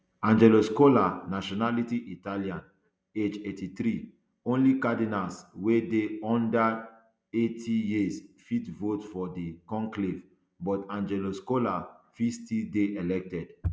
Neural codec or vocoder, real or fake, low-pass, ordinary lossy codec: none; real; none; none